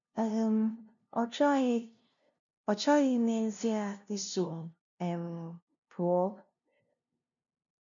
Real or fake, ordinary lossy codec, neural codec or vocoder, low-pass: fake; none; codec, 16 kHz, 0.5 kbps, FunCodec, trained on LibriTTS, 25 frames a second; 7.2 kHz